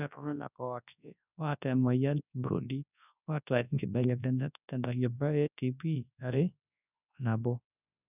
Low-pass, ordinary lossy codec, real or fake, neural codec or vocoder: 3.6 kHz; none; fake; codec, 24 kHz, 0.9 kbps, WavTokenizer, large speech release